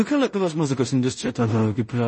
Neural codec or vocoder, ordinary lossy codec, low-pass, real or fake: codec, 16 kHz in and 24 kHz out, 0.4 kbps, LongCat-Audio-Codec, two codebook decoder; MP3, 32 kbps; 10.8 kHz; fake